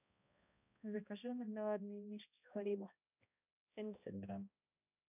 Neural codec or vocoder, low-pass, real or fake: codec, 16 kHz, 1 kbps, X-Codec, HuBERT features, trained on balanced general audio; 3.6 kHz; fake